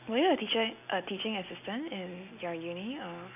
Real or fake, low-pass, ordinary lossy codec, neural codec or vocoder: real; 3.6 kHz; none; none